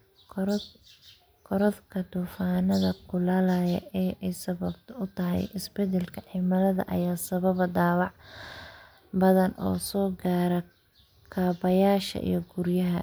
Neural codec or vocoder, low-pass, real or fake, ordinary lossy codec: none; none; real; none